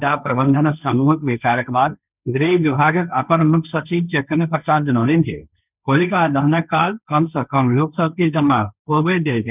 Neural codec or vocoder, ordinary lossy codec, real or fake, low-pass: codec, 16 kHz, 1.1 kbps, Voila-Tokenizer; none; fake; 3.6 kHz